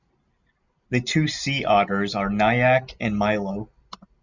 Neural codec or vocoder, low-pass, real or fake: none; 7.2 kHz; real